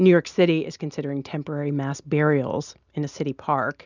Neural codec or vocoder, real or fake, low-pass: none; real; 7.2 kHz